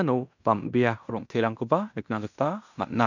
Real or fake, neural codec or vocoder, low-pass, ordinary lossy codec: fake; codec, 16 kHz in and 24 kHz out, 0.9 kbps, LongCat-Audio-Codec, fine tuned four codebook decoder; 7.2 kHz; none